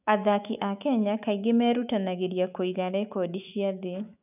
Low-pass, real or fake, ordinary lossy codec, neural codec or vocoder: 3.6 kHz; fake; none; autoencoder, 48 kHz, 128 numbers a frame, DAC-VAE, trained on Japanese speech